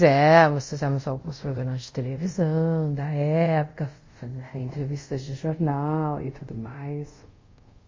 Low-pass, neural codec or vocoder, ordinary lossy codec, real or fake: 7.2 kHz; codec, 24 kHz, 0.5 kbps, DualCodec; MP3, 32 kbps; fake